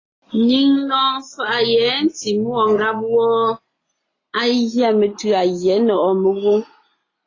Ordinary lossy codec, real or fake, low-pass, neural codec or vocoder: AAC, 32 kbps; real; 7.2 kHz; none